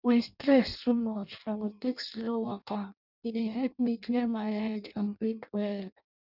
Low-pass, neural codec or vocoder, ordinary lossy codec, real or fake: 5.4 kHz; codec, 16 kHz in and 24 kHz out, 0.6 kbps, FireRedTTS-2 codec; none; fake